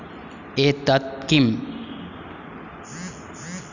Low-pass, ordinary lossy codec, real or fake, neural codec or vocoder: 7.2 kHz; none; real; none